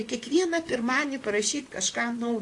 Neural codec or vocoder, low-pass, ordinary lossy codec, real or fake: vocoder, 44.1 kHz, 128 mel bands, Pupu-Vocoder; 10.8 kHz; AAC, 48 kbps; fake